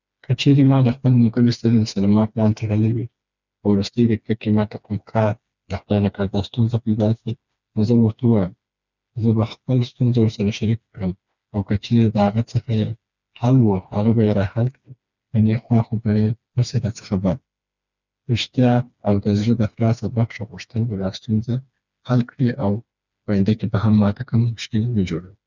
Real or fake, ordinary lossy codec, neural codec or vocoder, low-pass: fake; none; codec, 16 kHz, 2 kbps, FreqCodec, smaller model; 7.2 kHz